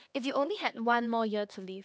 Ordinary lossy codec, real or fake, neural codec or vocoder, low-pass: none; fake; codec, 16 kHz, 2 kbps, X-Codec, HuBERT features, trained on LibriSpeech; none